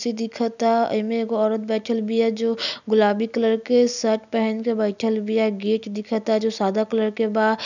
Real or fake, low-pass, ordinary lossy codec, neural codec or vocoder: real; 7.2 kHz; none; none